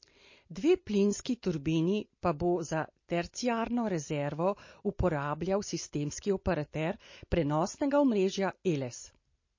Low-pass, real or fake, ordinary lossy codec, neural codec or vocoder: 7.2 kHz; real; MP3, 32 kbps; none